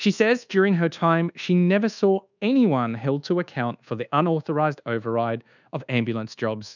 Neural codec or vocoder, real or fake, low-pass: codec, 24 kHz, 1.2 kbps, DualCodec; fake; 7.2 kHz